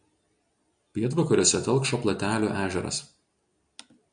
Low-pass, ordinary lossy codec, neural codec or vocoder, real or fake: 9.9 kHz; MP3, 96 kbps; none; real